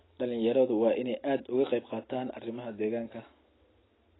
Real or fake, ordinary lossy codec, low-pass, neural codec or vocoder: real; AAC, 16 kbps; 7.2 kHz; none